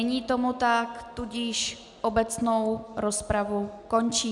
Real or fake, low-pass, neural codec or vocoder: real; 10.8 kHz; none